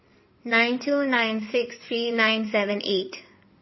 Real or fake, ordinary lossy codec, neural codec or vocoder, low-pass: fake; MP3, 24 kbps; codec, 16 kHz in and 24 kHz out, 2.2 kbps, FireRedTTS-2 codec; 7.2 kHz